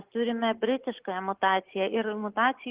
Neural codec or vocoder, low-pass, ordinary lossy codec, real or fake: none; 3.6 kHz; Opus, 16 kbps; real